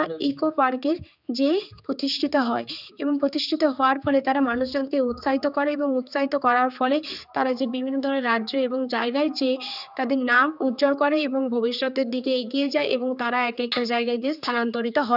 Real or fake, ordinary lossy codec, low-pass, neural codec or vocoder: fake; none; 5.4 kHz; codec, 16 kHz, 4 kbps, X-Codec, HuBERT features, trained on general audio